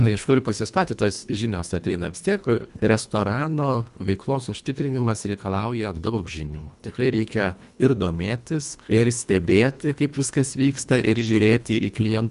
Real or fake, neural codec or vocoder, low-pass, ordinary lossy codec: fake; codec, 24 kHz, 1.5 kbps, HILCodec; 10.8 kHz; MP3, 96 kbps